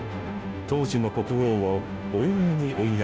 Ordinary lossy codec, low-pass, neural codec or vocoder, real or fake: none; none; codec, 16 kHz, 0.5 kbps, FunCodec, trained on Chinese and English, 25 frames a second; fake